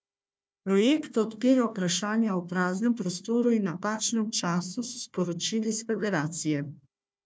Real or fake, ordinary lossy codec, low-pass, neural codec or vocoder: fake; none; none; codec, 16 kHz, 1 kbps, FunCodec, trained on Chinese and English, 50 frames a second